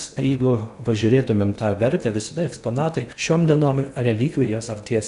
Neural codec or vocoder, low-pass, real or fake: codec, 16 kHz in and 24 kHz out, 0.8 kbps, FocalCodec, streaming, 65536 codes; 10.8 kHz; fake